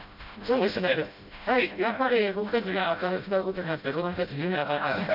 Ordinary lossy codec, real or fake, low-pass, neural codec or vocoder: none; fake; 5.4 kHz; codec, 16 kHz, 0.5 kbps, FreqCodec, smaller model